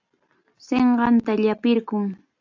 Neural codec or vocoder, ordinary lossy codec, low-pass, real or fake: none; AAC, 48 kbps; 7.2 kHz; real